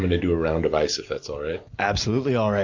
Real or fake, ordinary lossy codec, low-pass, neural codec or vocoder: real; MP3, 64 kbps; 7.2 kHz; none